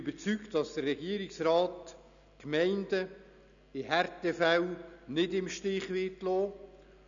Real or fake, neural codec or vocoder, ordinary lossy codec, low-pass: real; none; none; 7.2 kHz